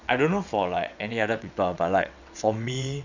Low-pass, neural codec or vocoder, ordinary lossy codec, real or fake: 7.2 kHz; vocoder, 22.05 kHz, 80 mel bands, WaveNeXt; none; fake